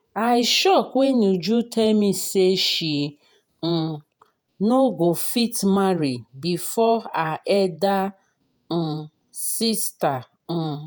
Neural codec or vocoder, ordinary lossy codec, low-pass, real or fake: vocoder, 48 kHz, 128 mel bands, Vocos; none; none; fake